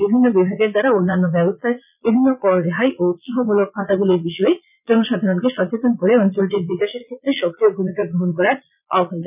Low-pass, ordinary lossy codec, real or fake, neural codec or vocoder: 3.6 kHz; none; fake; vocoder, 22.05 kHz, 80 mel bands, Vocos